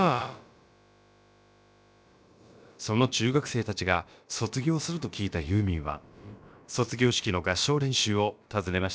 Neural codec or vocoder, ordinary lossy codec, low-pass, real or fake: codec, 16 kHz, about 1 kbps, DyCAST, with the encoder's durations; none; none; fake